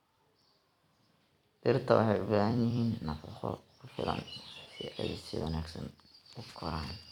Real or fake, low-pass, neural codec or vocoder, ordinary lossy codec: fake; 19.8 kHz; vocoder, 44.1 kHz, 128 mel bands every 256 samples, BigVGAN v2; none